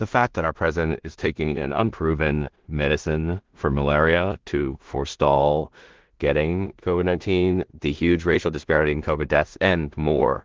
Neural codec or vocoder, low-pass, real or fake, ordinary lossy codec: codec, 16 kHz in and 24 kHz out, 0.4 kbps, LongCat-Audio-Codec, two codebook decoder; 7.2 kHz; fake; Opus, 16 kbps